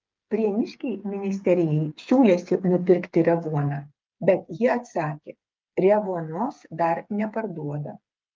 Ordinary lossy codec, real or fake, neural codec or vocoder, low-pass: Opus, 24 kbps; fake; codec, 16 kHz, 8 kbps, FreqCodec, smaller model; 7.2 kHz